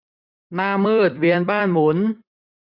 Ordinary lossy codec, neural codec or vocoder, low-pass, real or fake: none; vocoder, 44.1 kHz, 128 mel bands every 256 samples, BigVGAN v2; 5.4 kHz; fake